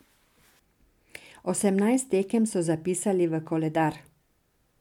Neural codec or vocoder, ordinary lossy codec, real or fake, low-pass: none; MP3, 96 kbps; real; 19.8 kHz